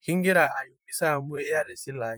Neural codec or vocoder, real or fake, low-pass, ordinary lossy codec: vocoder, 44.1 kHz, 128 mel bands, Pupu-Vocoder; fake; none; none